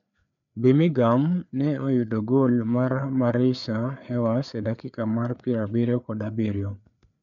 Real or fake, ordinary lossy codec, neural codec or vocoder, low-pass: fake; none; codec, 16 kHz, 4 kbps, FreqCodec, larger model; 7.2 kHz